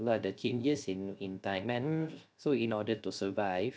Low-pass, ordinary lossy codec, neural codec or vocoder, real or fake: none; none; codec, 16 kHz, 0.3 kbps, FocalCodec; fake